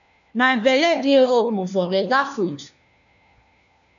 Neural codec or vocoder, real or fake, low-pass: codec, 16 kHz, 1 kbps, FunCodec, trained on LibriTTS, 50 frames a second; fake; 7.2 kHz